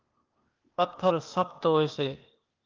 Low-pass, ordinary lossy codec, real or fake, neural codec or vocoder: 7.2 kHz; Opus, 32 kbps; fake; codec, 16 kHz, 0.8 kbps, ZipCodec